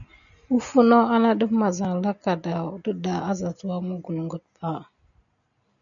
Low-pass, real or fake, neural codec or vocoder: 7.2 kHz; real; none